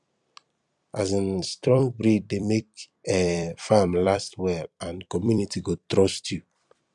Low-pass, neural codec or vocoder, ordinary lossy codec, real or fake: 10.8 kHz; vocoder, 44.1 kHz, 128 mel bands every 256 samples, BigVGAN v2; none; fake